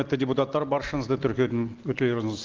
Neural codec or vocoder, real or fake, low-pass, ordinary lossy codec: none; real; 7.2 kHz; Opus, 16 kbps